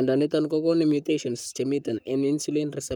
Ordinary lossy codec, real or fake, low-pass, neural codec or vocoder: none; fake; none; codec, 44.1 kHz, 7.8 kbps, Pupu-Codec